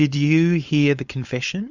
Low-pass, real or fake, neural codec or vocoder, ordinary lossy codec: 7.2 kHz; fake; codec, 16 kHz, 4.8 kbps, FACodec; Opus, 64 kbps